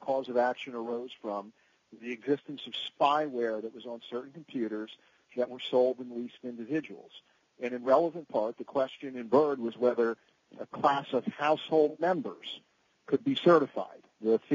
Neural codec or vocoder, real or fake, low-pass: none; real; 7.2 kHz